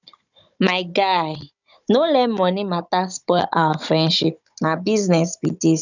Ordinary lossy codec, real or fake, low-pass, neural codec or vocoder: none; fake; 7.2 kHz; codec, 16 kHz, 16 kbps, FunCodec, trained on Chinese and English, 50 frames a second